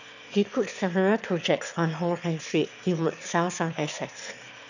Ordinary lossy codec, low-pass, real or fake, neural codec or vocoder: none; 7.2 kHz; fake; autoencoder, 22.05 kHz, a latent of 192 numbers a frame, VITS, trained on one speaker